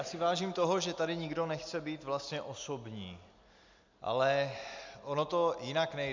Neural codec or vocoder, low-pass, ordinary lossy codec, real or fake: none; 7.2 kHz; MP3, 64 kbps; real